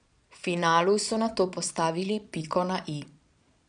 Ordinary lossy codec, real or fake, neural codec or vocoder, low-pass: none; fake; vocoder, 22.05 kHz, 80 mel bands, Vocos; 9.9 kHz